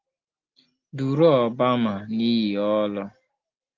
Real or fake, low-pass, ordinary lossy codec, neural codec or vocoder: real; 7.2 kHz; Opus, 32 kbps; none